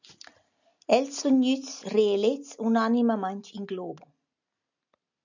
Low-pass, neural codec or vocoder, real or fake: 7.2 kHz; none; real